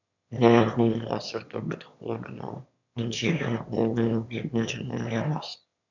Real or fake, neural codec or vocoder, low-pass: fake; autoencoder, 22.05 kHz, a latent of 192 numbers a frame, VITS, trained on one speaker; 7.2 kHz